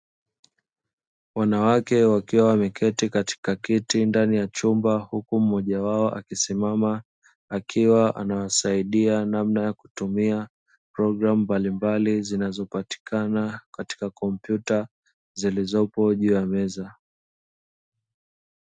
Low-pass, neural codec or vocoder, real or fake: 9.9 kHz; none; real